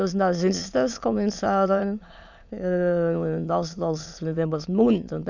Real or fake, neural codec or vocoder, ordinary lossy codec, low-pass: fake; autoencoder, 22.05 kHz, a latent of 192 numbers a frame, VITS, trained on many speakers; none; 7.2 kHz